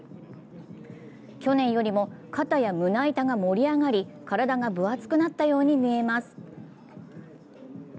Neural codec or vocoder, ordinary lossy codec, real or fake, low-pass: none; none; real; none